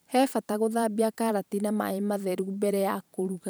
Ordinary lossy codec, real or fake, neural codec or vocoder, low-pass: none; fake; vocoder, 44.1 kHz, 128 mel bands every 512 samples, BigVGAN v2; none